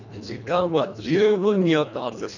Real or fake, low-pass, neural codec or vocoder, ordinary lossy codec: fake; 7.2 kHz; codec, 24 kHz, 1.5 kbps, HILCodec; none